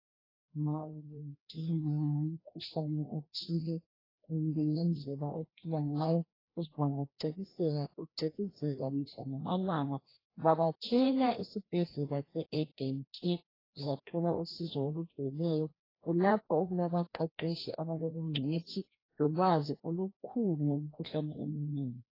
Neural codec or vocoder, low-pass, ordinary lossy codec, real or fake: codec, 16 kHz, 1 kbps, FreqCodec, larger model; 5.4 kHz; AAC, 24 kbps; fake